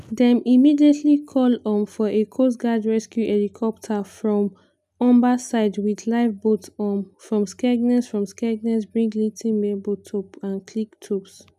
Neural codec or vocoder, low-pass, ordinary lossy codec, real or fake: none; 14.4 kHz; none; real